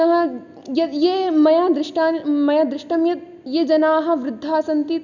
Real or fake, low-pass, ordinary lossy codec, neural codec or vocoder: real; 7.2 kHz; none; none